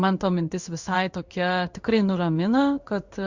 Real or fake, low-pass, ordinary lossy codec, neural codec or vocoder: fake; 7.2 kHz; Opus, 64 kbps; codec, 16 kHz in and 24 kHz out, 1 kbps, XY-Tokenizer